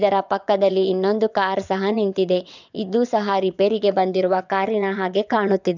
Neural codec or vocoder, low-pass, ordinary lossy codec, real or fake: vocoder, 22.05 kHz, 80 mel bands, WaveNeXt; 7.2 kHz; none; fake